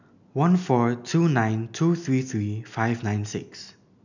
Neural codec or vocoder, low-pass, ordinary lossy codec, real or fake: none; 7.2 kHz; none; real